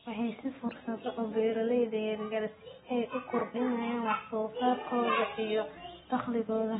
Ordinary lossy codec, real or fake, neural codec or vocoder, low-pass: AAC, 16 kbps; real; none; 19.8 kHz